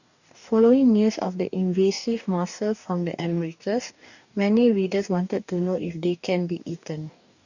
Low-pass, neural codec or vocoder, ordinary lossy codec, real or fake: 7.2 kHz; codec, 44.1 kHz, 2.6 kbps, DAC; none; fake